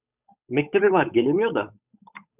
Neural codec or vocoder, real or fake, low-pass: codec, 16 kHz, 8 kbps, FunCodec, trained on Chinese and English, 25 frames a second; fake; 3.6 kHz